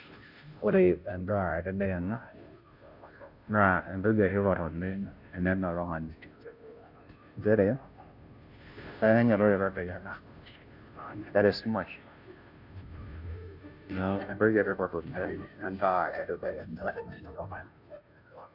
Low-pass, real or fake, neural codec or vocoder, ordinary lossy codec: 5.4 kHz; fake; codec, 16 kHz, 0.5 kbps, FunCodec, trained on Chinese and English, 25 frames a second; none